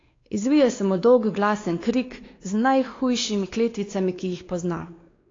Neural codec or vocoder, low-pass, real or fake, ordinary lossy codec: codec, 16 kHz, 2 kbps, X-Codec, WavLM features, trained on Multilingual LibriSpeech; 7.2 kHz; fake; AAC, 32 kbps